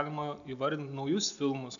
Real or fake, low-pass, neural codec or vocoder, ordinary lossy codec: real; 7.2 kHz; none; MP3, 96 kbps